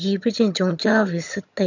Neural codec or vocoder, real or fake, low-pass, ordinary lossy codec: vocoder, 22.05 kHz, 80 mel bands, HiFi-GAN; fake; 7.2 kHz; none